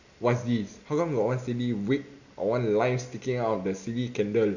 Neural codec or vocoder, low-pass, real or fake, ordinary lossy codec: none; 7.2 kHz; real; none